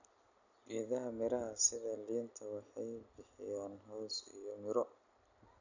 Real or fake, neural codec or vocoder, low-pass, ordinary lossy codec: real; none; 7.2 kHz; none